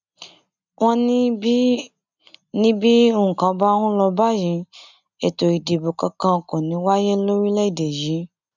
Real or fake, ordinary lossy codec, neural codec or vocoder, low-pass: real; none; none; 7.2 kHz